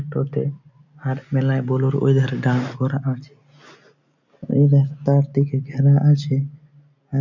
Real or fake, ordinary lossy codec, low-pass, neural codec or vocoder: real; none; 7.2 kHz; none